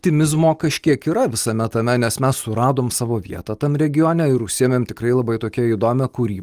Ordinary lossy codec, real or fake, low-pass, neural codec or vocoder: Opus, 32 kbps; real; 14.4 kHz; none